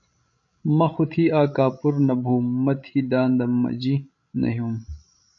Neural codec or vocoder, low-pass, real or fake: codec, 16 kHz, 16 kbps, FreqCodec, larger model; 7.2 kHz; fake